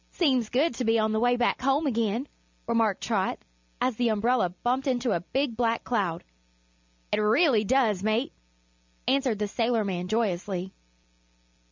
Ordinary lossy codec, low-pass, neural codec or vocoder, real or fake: MP3, 64 kbps; 7.2 kHz; none; real